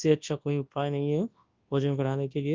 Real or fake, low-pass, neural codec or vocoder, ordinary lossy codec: fake; 7.2 kHz; codec, 24 kHz, 0.9 kbps, WavTokenizer, large speech release; Opus, 32 kbps